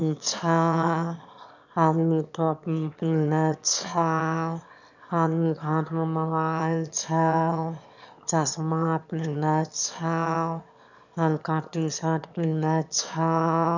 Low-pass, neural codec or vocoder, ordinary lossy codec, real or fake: 7.2 kHz; autoencoder, 22.05 kHz, a latent of 192 numbers a frame, VITS, trained on one speaker; none; fake